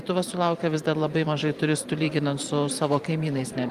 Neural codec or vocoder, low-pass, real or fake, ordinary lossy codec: vocoder, 44.1 kHz, 128 mel bands every 512 samples, BigVGAN v2; 14.4 kHz; fake; Opus, 32 kbps